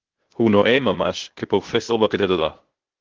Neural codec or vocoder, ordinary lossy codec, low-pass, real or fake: codec, 16 kHz, 0.8 kbps, ZipCodec; Opus, 16 kbps; 7.2 kHz; fake